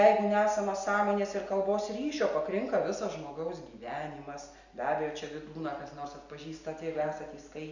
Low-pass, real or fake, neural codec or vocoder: 7.2 kHz; real; none